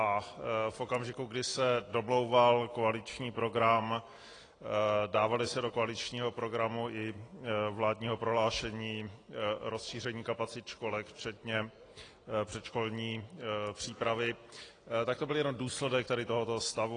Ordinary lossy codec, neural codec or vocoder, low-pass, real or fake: AAC, 32 kbps; none; 9.9 kHz; real